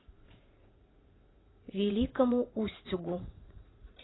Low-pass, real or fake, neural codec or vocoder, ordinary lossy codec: 7.2 kHz; fake; vocoder, 44.1 kHz, 80 mel bands, Vocos; AAC, 16 kbps